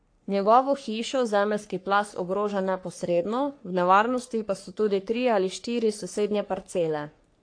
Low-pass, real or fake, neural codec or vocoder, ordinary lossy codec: 9.9 kHz; fake; codec, 44.1 kHz, 3.4 kbps, Pupu-Codec; AAC, 48 kbps